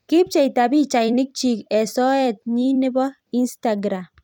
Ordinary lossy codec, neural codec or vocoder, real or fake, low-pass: none; vocoder, 44.1 kHz, 128 mel bands every 256 samples, BigVGAN v2; fake; 19.8 kHz